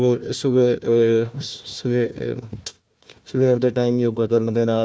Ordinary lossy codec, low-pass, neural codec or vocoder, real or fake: none; none; codec, 16 kHz, 1 kbps, FunCodec, trained on Chinese and English, 50 frames a second; fake